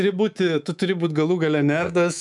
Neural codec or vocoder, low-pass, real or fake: codec, 24 kHz, 3.1 kbps, DualCodec; 10.8 kHz; fake